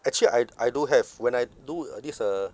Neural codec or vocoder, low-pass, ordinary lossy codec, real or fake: none; none; none; real